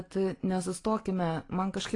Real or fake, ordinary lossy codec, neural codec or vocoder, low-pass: real; AAC, 32 kbps; none; 10.8 kHz